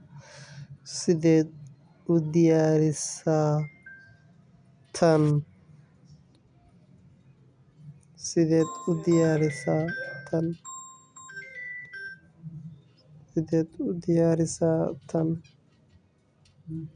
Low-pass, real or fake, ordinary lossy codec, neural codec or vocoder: 9.9 kHz; real; none; none